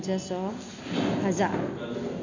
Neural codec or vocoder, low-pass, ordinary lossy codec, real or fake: none; 7.2 kHz; none; real